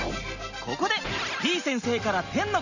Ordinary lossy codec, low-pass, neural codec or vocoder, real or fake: none; 7.2 kHz; none; real